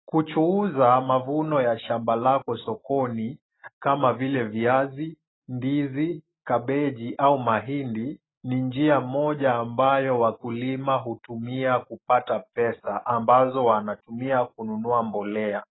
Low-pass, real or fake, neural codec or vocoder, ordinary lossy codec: 7.2 kHz; real; none; AAC, 16 kbps